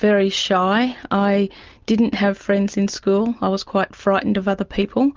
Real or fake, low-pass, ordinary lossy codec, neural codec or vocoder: real; 7.2 kHz; Opus, 32 kbps; none